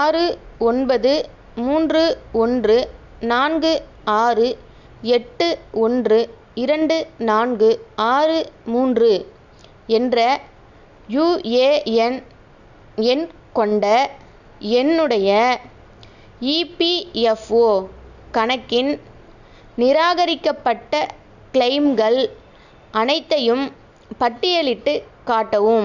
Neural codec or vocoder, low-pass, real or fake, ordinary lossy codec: none; 7.2 kHz; real; none